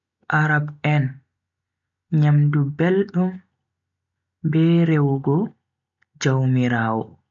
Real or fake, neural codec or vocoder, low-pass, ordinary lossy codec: real; none; 7.2 kHz; none